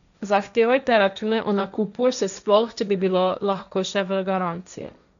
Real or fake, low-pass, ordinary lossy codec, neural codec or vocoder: fake; 7.2 kHz; none; codec, 16 kHz, 1.1 kbps, Voila-Tokenizer